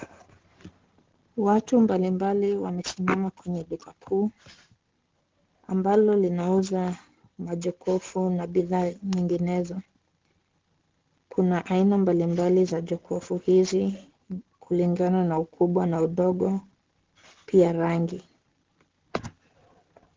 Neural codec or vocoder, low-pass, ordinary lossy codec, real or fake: none; 7.2 kHz; Opus, 16 kbps; real